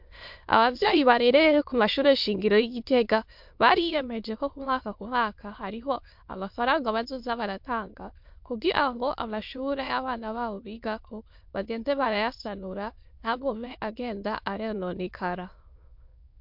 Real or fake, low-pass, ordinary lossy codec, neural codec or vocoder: fake; 5.4 kHz; MP3, 48 kbps; autoencoder, 22.05 kHz, a latent of 192 numbers a frame, VITS, trained on many speakers